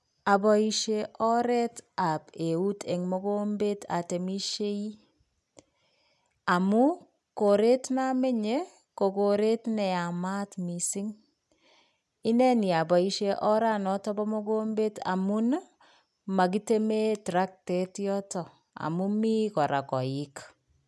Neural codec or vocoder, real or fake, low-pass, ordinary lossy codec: none; real; none; none